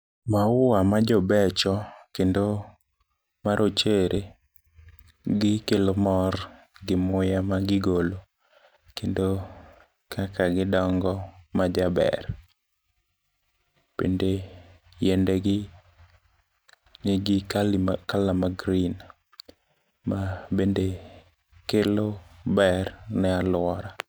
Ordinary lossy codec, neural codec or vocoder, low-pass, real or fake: none; none; none; real